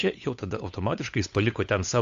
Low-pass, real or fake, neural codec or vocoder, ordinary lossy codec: 7.2 kHz; fake; codec, 16 kHz, 8 kbps, FunCodec, trained on Chinese and English, 25 frames a second; AAC, 64 kbps